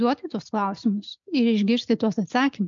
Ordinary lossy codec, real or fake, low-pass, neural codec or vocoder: MP3, 64 kbps; real; 7.2 kHz; none